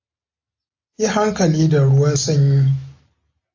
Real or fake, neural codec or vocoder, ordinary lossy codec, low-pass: real; none; AAC, 48 kbps; 7.2 kHz